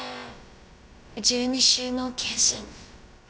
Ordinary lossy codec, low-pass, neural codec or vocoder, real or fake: none; none; codec, 16 kHz, about 1 kbps, DyCAST, with the encoder's durations; fake